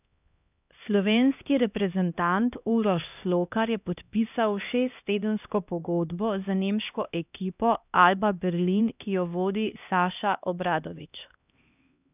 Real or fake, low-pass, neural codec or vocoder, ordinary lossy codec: fake; 3.6 kHz; codec, 16 kHz, 1 kbps, X-Codec, HuBERT features, trained on LibriSpeech; none